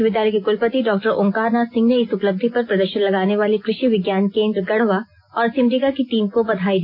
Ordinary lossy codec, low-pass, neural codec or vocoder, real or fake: MP3, 32 kbps; 5.4 kHz; none; real